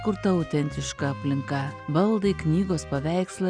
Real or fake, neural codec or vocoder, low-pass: real; none; 9.9 kHz